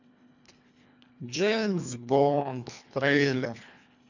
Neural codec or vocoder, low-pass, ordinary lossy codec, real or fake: codec, 24 kHz, 1.5 kbps, HILCodec; 7.2 kHz; none; fake